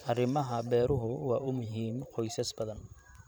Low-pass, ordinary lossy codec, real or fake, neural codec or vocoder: none; none; fake; vocoder, 44.1 kHz, 128 mel bands every 512 samples, BigVGAN v2